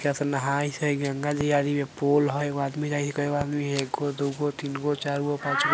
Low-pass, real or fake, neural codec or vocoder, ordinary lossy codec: none; real; none; none